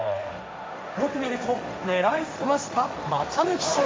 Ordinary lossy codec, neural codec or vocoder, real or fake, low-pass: none; codec, 16 kHz, 1.1 kbps, Voila-Tokenizer; fake; none